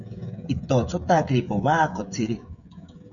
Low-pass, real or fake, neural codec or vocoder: 7.2 kHz; fake; codec, 16 kHz, 16 kbps, FreqCodec, smaller model